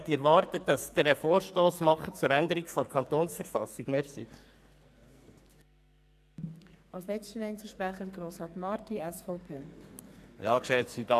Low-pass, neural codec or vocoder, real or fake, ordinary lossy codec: 14.4 kHz; codec, 44.1 kHz, 2.6 kbps, SNAC; fake; AAC, 96 kbps